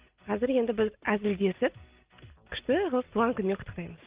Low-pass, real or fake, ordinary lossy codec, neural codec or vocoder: 3.6 kHz; real; Opus, 32 kbps; none